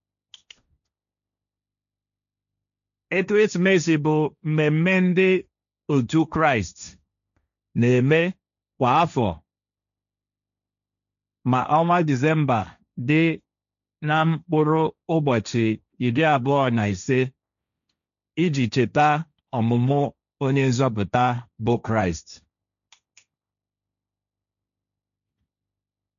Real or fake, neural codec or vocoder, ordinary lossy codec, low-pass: fake; codec, 16 kHz, 1.1 kbps, Voila-Tokenizer; MP3, 96 kbps; 7.2 kHz